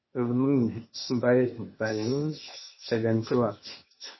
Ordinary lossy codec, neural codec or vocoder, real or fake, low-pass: MP3, 24 kbps; codec, 16 kHz, 0.8 kbps, ZipCodec; fake; 7.2 kHz